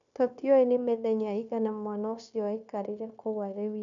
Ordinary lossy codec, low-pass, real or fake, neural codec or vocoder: none; 7.2 kHz; fake; codec, 16 kHz, 0.9 kbps, LongCat-Audio-Codec